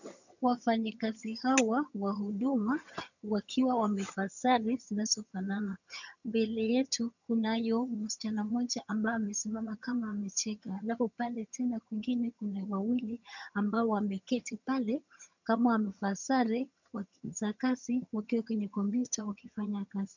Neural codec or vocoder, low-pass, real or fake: vocoder, 22.05 kHz, 80 mel bands, HiFi-GAN; 7.2 kHz; fake